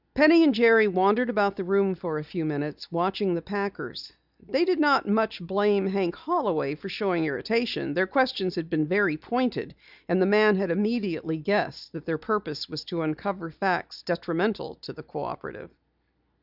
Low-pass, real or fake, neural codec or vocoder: 5.4 kHz; real; none